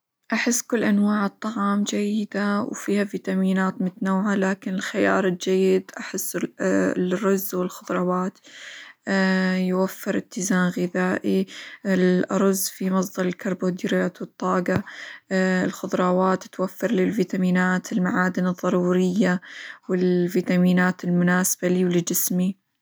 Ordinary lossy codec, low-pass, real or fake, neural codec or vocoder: none; none; real; none